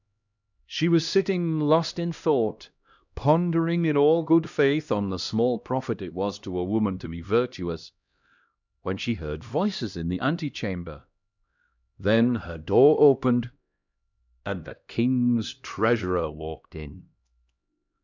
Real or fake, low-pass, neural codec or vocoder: fake; 7.2 kHz; codec, 16 kHz, 1 kbps, X-Codec, HuBERT features, trained on LibriSpeech